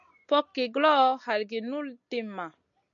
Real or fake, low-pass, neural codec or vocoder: real; 7.2 kHz; none